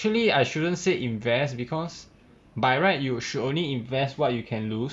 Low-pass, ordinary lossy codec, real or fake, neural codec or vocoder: none; none; real; none